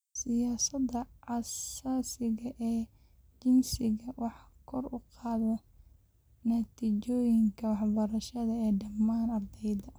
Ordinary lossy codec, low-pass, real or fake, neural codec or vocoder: none; none; real; none